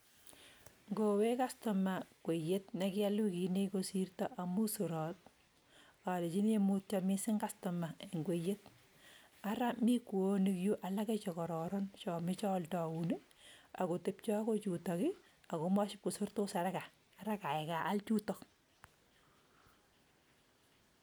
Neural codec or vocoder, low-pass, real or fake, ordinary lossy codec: none; none; real; none